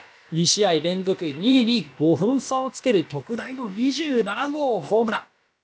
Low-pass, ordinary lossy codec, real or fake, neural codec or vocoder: none; none; fake; codec, 16 kHz, about 1 kbps, DyCAST, with the encoder's durations